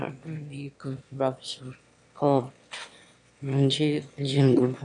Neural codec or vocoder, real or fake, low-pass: autoencoder, 22.05 kHz, a latent of 192 numbers a frame, VITS, trained on one speaker; fake; 9.9 kHz